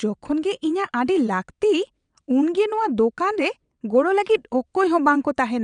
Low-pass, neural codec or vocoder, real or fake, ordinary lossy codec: 9.9 kHz; vocoder, 22.05 kHz, 80 mel bands, WaveNeXt; fake; none